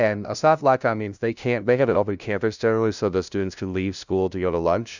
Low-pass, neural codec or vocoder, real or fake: 7.2 kHz; codec, 16 kHz, 0.5 kbps, FunCodec, trained on LibriTTS, 25 frames a second; fake